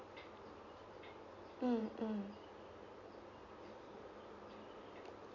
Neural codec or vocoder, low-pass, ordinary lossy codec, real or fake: none; 7.2 kHz; Opus, 64 kbps; real